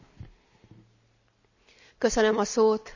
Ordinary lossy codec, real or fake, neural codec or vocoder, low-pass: none; real; none; 7.2 kHz